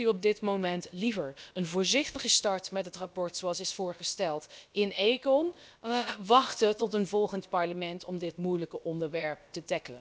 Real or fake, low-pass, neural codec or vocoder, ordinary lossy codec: fake; none; codec, 16 kHz, about 1 kbps, DyCAST, with the encoder's durations; none